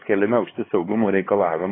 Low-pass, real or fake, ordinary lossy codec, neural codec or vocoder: 7.2 kHz; fake; AAC, 16 kbps; codec, 16 kHz, 4 kbps, X-Codec, HuBERT features, trained on LibriSpeech